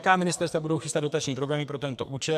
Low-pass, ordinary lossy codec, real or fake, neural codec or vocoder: 14.4 kHz; MP3, 96 kbps; fake; codec, 44.1 kHz, 2.6 kbps, SNAC